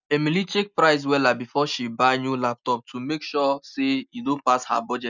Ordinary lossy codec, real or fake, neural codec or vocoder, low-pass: none; real; none; 7.2 kHz